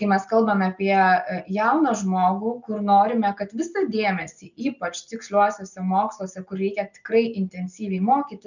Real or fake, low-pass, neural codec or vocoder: real; 7.2 kHz; none